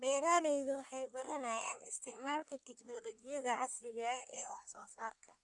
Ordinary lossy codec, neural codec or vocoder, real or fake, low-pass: none; codec, 24 kHz, 1 kbps, SNAC; fake; 10.8 kHz